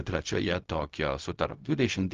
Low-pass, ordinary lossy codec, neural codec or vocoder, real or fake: 7.2 kHz; Opus, 32 kbps; codec, 16 kHz, 0.4 kbps, LongCat-Audio-Codec; fake